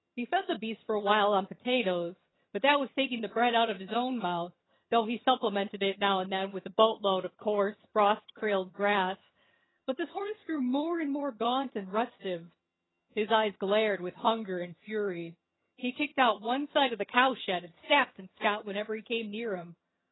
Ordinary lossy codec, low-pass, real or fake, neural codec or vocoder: AAC, 16 kbps; 7.2 kHz; fake; vocoder, 22.05 kHz, 80 mel bands, HiFi-GAN